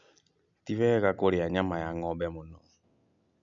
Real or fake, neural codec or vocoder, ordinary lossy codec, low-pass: real; none; none; 7.2 kHz